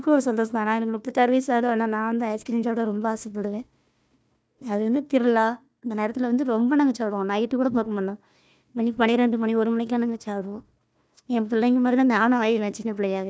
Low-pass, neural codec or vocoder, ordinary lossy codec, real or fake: none; codec, 16 kHz, 1 kbps, FunCodec, trained on Chinese and English, 50 frames a second; none; fake